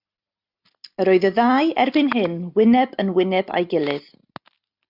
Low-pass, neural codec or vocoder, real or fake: 5.4 kHz; none; real